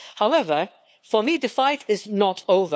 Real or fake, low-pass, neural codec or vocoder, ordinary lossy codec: fake; none; codec, 16 kHz, 2 kbps, FunCodec, trained on LibriTTS, 25 frames a second; none